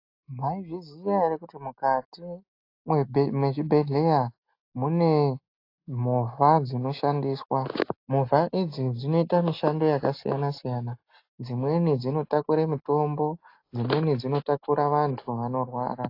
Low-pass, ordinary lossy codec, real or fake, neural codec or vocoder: 5.4 kHz; AAC, 32 kbps; real; none